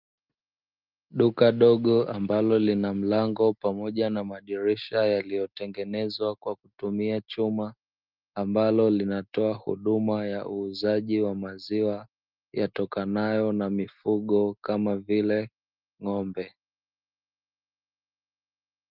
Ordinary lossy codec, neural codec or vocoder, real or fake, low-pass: Opus, 32 kbps; none; real; 5.4 kHz